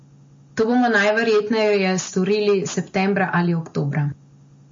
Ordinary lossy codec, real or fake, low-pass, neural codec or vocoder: MP3, 32 kbps; real; 7.2 kHz; none